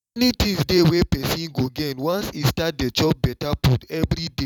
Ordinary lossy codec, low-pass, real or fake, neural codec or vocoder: none; 19.8 kHz; fake; vocoder, 44.1 kHz, 128 mel bands every 512 samples, BigVGAN v2